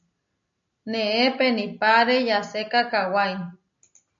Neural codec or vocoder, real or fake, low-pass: none; real; 7.2 kHz